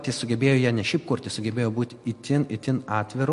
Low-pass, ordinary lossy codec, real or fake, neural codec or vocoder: 14.4 kHz; MP3, 48 kbps; real; none